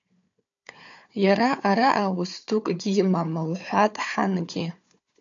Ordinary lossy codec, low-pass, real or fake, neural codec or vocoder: AAC, 64 kbps; 7.2 kHz; fake; codec, 16 kHz, 4 kbps, FunCodec, trained on Chinese and English, 50 frames a second